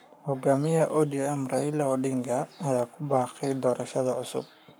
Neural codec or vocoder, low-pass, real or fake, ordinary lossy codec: codec, 44.1 kHz, 7.8 kbps, Pupu-Codec; none; fake; none